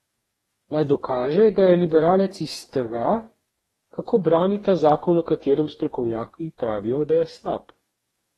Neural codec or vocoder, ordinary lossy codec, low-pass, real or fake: codec, 44.1 kHz, 2.6 kbps, DAC; AAC, 32 kbps; 19.8 kHz; fake